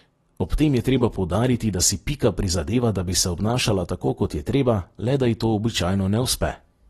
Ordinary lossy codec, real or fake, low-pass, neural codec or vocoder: AAC, 32 kbps; real; 19.8 kHz; none